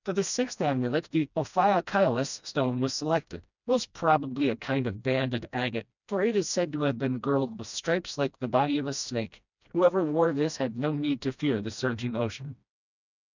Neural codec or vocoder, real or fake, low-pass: codec, 16 kHz, 1 kbps, FreqCodec, smaller model; fake; 7.2 kHz